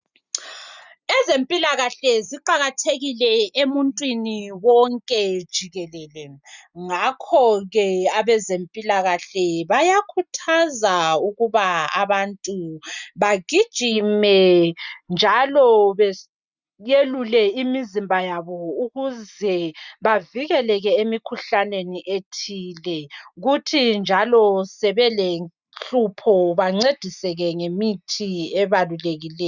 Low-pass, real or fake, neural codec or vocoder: 7.2 kHz; real; none